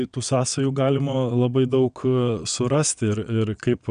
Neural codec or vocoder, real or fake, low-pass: vocoder, 22.05 kHz, 80 mel bands, WaveNeXt; fake; 9.9 kHz